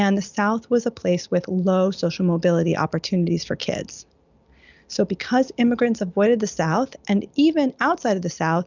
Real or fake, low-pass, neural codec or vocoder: real; 7.2 kHz; none